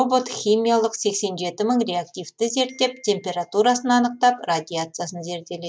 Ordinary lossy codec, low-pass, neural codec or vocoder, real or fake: none; none; none; real